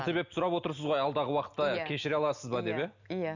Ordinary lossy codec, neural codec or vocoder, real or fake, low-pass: none; none; real; 7.2 kHz